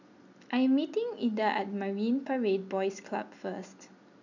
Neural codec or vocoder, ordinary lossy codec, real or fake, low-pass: none; none; real; 7.2 kHz